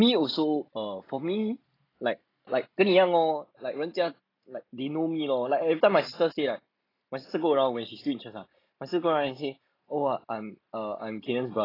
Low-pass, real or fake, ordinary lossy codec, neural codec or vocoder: 5.4 kHz; real; AAC, 24 kbps; none